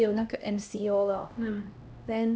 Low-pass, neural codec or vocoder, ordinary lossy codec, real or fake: none; codec, 16 kHz, 1 kbps, X-Codec, HuBERT features, trained on LibriSpeech; none; fake